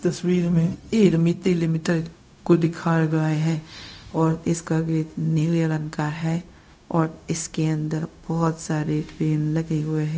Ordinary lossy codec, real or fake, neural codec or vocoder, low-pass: none; fake; codec, 16 kHz, 0.4 kbps, LongCat-Audio-Codec; none